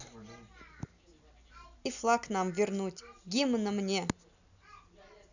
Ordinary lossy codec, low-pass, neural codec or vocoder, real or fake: none; 7.2 kHz; none; real